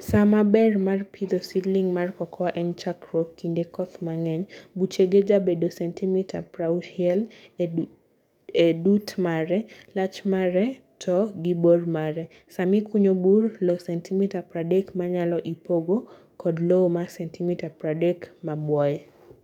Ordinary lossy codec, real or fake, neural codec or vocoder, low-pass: none; fake; codec, 44.1 kHz, 7.8 kbps, DAC; 19.8 kHz